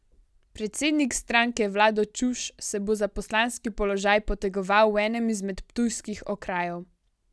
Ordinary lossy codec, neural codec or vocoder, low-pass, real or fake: none; none; none; real